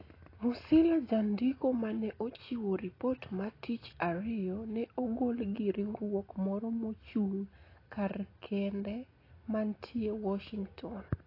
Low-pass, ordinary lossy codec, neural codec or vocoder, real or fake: 5.4 kHz; AAC, 24 kbps; none; real